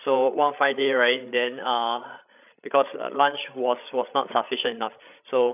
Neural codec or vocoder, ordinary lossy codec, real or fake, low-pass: codec, 16 kHz, 8 kbps, FreqCodec, larger model; none; fake; 3.6 kHz